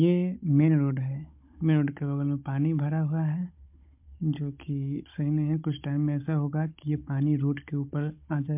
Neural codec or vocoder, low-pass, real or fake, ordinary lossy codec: codec, 16 kHz, 16 kbps, FunCodec, trained on Chinese and English, 50 frames a second; 3.6 kHz; fake; MP3, 32 kbps